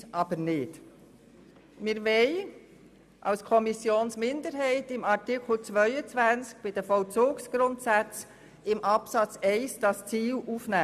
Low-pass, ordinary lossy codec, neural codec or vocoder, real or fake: 14.4 kHz; none; none; real